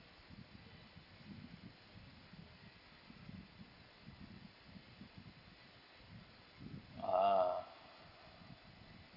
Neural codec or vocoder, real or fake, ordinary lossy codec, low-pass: none; real; none; 5.4 kHz